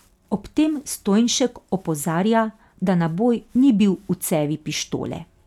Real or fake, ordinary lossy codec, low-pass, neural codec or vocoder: real; none; 19.8 kHz; none